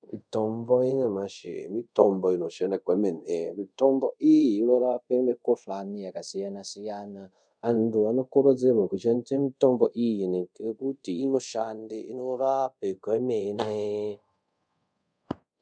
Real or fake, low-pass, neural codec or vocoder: fake; 9.9 kHz; codec, 24 kHz, 0.5 kbps, DualCodec